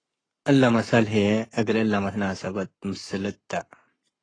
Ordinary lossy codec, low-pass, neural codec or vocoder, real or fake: AAC, 32 kbps; 9.9 kHz; codec, 44.1 kHz, 7.8 kbps, Pupu-Codec; fake